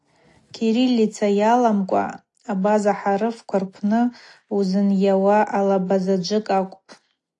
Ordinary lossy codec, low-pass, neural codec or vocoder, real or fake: AAC, 48 kbps; 10.8 kHz; none; real